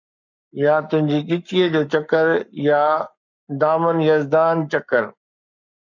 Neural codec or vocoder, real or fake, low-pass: codec, 44.1 kHz, 7.8 kbps, Pupu-Codec; fake; 7.2 kHz